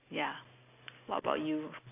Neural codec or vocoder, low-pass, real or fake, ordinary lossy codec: none; 3.6 kHz; real; AAC, 24 kbps